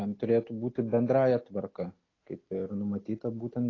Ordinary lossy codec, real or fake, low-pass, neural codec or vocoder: AAC, 32 kbps; real; 7.2 kHz; none